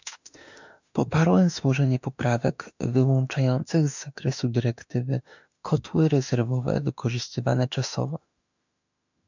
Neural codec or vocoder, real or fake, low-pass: autoencoder, 48 kHz, 32 numbers a frame, DAC-VAE, trained on Japanese speech; fake; 7.2 kHz